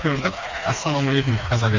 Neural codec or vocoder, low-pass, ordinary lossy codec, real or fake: codec, 16 kHz, 2 kbps, FreqCodec, smaller model; 7.2 kHz; Opus, 32 kbps; fake